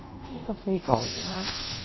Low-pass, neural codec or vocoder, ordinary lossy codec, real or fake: 7.2 kHz; codec, 24 kHz, 0.5 kbps, DualCodec; MP3, 24 kbps; fake